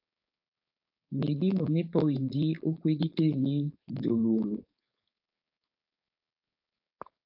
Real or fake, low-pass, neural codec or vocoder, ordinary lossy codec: fake; 5.4 kHz; codec, 16 kHz, 4.8 kbps, FACodec; AAC, 48 kbps